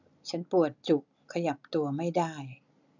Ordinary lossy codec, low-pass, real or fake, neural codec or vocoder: none; 7.2 kHz; real; none